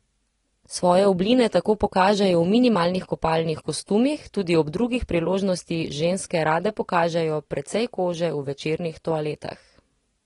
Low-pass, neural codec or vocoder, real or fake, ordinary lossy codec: 10.8 kHz; none; real; AAC, 32 kbps